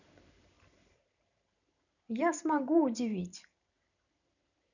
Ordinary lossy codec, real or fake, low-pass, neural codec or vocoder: none; fake; 7.2 kHz; vocoder, 44.1 kHz, 128 mel bands every 512 samples, BigVGAN v2